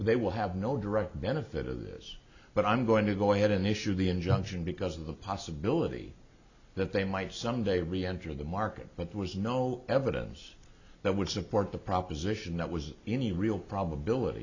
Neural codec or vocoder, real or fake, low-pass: none; real; 7.2 kHz